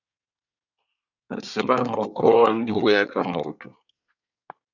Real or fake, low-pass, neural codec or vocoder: fake; 7.2 kHz; codec, 24 kHz, 1 kbps, SNAC